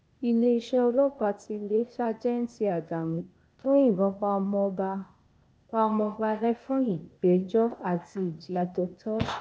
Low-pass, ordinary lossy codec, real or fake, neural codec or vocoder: none; none; fake; codec, 16 kHz, 0.8 kbps, ZipCodec